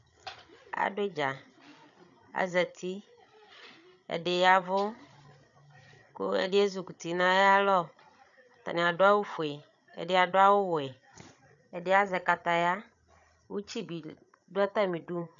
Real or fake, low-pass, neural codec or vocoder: fake; 7.2 kHz; codec, 16 kHz, 8 kbps, FreqCodec, larger model